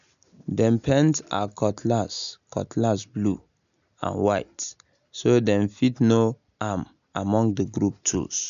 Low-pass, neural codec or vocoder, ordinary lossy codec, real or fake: 7.2 kHz; none; MP3, 96 kbps; real